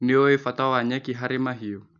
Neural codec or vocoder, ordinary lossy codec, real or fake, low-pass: none; none; real; 7.2 kHz